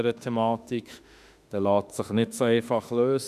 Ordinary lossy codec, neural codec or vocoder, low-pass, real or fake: none; autoencoder, 48 kHz, 32 numbers a frame, DAC-VAE, trained on Japanese speech; 14.4 kHz; fake